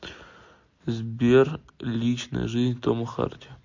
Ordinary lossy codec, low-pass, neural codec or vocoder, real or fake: MP3, 48 kbps; 7.2 kHz; none; real